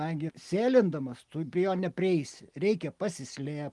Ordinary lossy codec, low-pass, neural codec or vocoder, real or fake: Opus, 24 kbps; 10.8 kHz; none; real